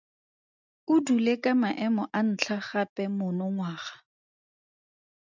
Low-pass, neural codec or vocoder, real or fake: 7.2 kHz; none; real